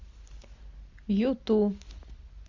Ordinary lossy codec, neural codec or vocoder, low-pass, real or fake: Opus, 64 kbps; none; 7.2 kHz; real